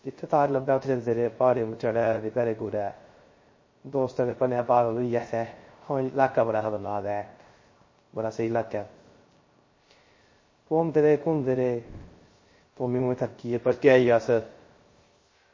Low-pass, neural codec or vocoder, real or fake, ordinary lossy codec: 7.2 kHz; codec, 16 kHz, 0.3 kbps, FocalCodec; fake; MP3, 32 kbps